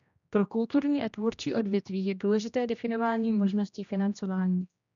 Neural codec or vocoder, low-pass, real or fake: codec, 16 kHz, 1 kbps, X-Codec, HuBERT features, trained on general audio; 7.2 kHz; fake